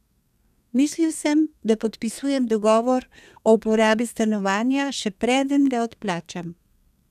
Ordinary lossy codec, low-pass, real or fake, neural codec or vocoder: none; 14.4 kHz; fake; codec, 32 kHz, 1.9 kbps, SNAC